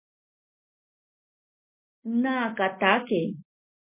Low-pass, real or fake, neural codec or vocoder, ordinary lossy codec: 3.6 kHz; real; none; MP3, 24 kbps